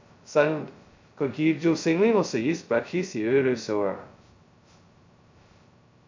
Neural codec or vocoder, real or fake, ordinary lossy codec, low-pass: codec, 16 kHz, 0.2 kbps, FocalCodec; fake; MP3, 64 kbps; 7.2 kHz